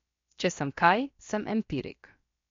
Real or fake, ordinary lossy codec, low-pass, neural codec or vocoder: fake; MP3, 48 kbps; 7.2 kHz; codec, 16 kHz, about 1 kbps, DyCAST, with the encoder's durations